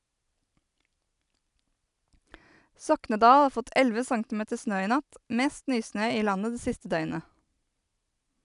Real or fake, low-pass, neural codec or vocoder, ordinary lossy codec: real; 10.8 kHz; none; none